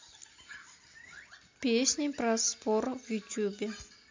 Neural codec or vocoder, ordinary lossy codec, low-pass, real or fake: none; MP3, 64 kbps; 7.2 kHz; real